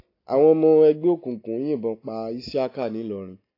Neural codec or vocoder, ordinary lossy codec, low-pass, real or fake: none; AAC, 32 kbps; 5.4 kHz; real